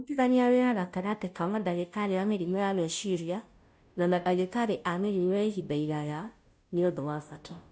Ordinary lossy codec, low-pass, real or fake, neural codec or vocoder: none; none; fake; codec, 16 kHz, 0.5 kbps, FunCodec, trained on Chinese and English, 25 frames a second